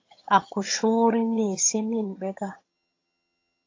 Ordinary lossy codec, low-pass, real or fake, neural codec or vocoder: AAC, 32 kbps; 7.2 kHz; fake; vocoder, 22.05 kHz, 80 mel bands, HiFi-GAN